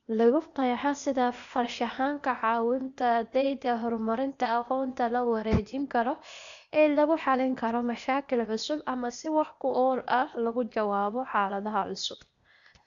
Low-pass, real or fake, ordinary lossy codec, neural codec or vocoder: 7.2 kHz; fake; none; codec, 16 kHz, 0.8 kbps, ZipCodec